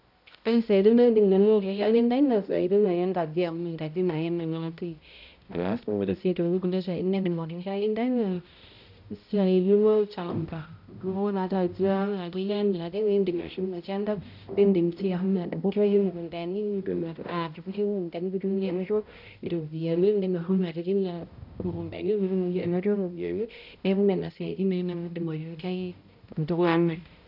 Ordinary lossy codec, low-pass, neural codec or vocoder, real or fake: none; 5.4 kHz; codec, 16 kHz, 0.5 kbps, X-Codec, HuBERT features, trained on balanced general audio; fake